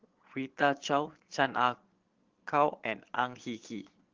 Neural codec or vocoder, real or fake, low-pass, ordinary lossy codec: vocoder, 22.05 kHz, 80 mel bands, Vocos; fake; 7.2 kHz; Opus, 16 kbps